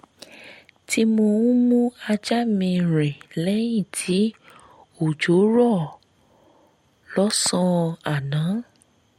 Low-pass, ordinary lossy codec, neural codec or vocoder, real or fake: 19.8 kHz; MP3, 64 kbps; none; real